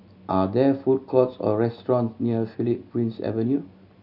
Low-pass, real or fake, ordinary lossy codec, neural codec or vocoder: 5.4 kHz; real; none; none